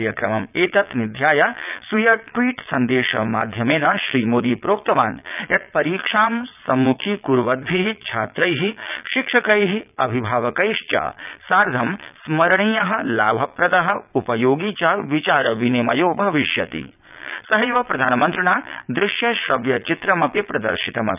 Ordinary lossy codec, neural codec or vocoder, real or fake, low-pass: none; vocoder, 22.05 kHz, 80 mel bands, Vocos; fake; 3.6 kHz